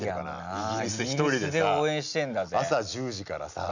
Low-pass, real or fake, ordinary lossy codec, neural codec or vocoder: 7.2 kHz; real; none; none